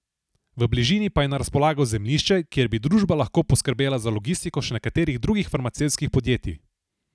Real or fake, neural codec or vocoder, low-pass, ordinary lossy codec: real; none; none; none